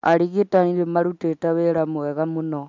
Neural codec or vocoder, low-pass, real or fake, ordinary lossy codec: none; 7.2 kHz; real; MP3, 64 kbps